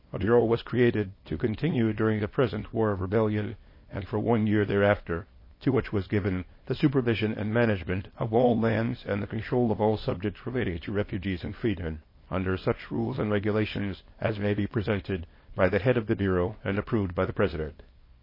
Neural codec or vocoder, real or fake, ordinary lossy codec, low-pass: codec, 24 kHz, 0.9 kbps, WavTokenizer, small release; fake; MP3, 24 kbps; 5.4 kHz